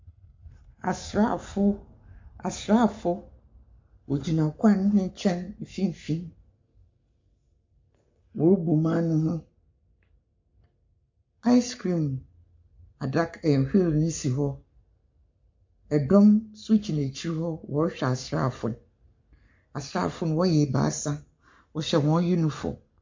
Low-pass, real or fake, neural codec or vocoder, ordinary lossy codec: 7.2 kHz; fake; codec, 44.1 kHz, 7.8 kbps, Pupu-Codec; MP3, 48 kbps